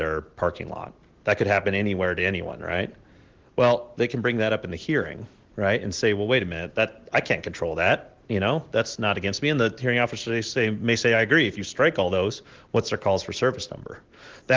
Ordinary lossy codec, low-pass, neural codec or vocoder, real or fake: Opus, 16 kbps; 7.2 kHz; none; real